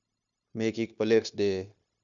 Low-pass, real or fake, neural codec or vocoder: 7.2 kHz; fake; codec, 16 kHz, 0.9 kbps, LongCat-Audio-Codec